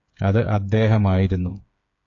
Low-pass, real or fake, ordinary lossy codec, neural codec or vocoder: 7.2 kHz; fake; AAC, 48 kbps; codec, 16 kHz, 8 kbps, FreqCodec, smaller model